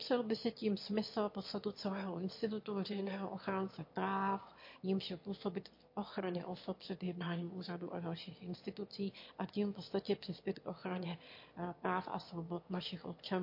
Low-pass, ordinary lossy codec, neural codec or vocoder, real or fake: 5.4 kHz; MP3, 32 kbps; autoencoder, 22.05 kHz, a latent of 192 numbers a frame, VITS, trained on one speaker; fake